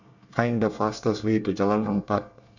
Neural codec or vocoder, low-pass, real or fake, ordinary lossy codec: codec, 24 kHz, 1 kbps, SNAC; 7.2 kHz; fake; none